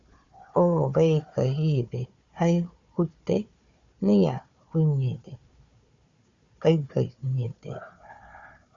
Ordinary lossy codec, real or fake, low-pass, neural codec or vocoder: Opus, 64 kbps; fake; 7.2 kHz; codec, 16 kHz, 4 kbps, FunCodec, trained on Chinese and English, 50 frames a second